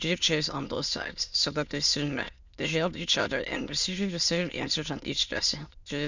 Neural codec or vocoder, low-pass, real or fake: autoencoder, 22.05 kHz, a latent of 192 numbers a frame, VITS, trained on many speakers; 7.2 kHz; fake